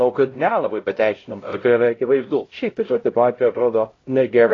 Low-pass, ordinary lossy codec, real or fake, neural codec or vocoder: 7.2 kHz; AAC, 32 kbps; fake; codec, 16 kHz, 0.5 kbps, X-Codec, HuBERT features, trained on LibriSpeech